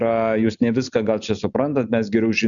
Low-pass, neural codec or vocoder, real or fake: 7.2 kHz; none; real